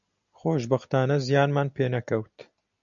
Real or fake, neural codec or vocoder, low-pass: real; none; 7.2 kHz